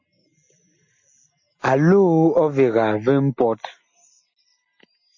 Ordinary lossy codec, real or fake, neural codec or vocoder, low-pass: MP3, 32 kbps; real; none; 7.2 kHz